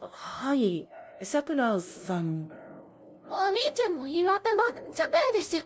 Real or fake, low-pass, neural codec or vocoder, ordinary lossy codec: fake; none; codec, 16 kHz, 0.5 kbps, FunCodec, trained on LibriTTS, 25 frames a second; none